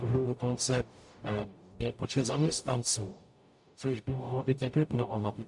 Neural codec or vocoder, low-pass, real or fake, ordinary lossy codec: codec, 44.1 kHz, 0.9 kbps, DAC; 10.8 kHz; fake; MP3, 64 kbps